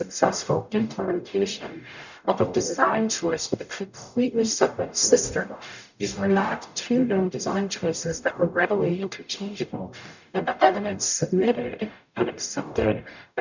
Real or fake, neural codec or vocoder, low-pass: fake; codec, 44.1 kHz, 0.9 kbps, DAC; 7.2 kHz